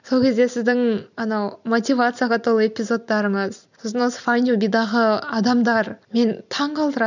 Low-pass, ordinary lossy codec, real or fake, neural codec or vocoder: 7.2 kHz; none; real; none